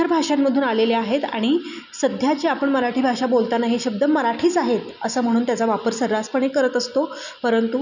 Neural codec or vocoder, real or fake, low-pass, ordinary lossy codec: none; real; 7.2 kHz; none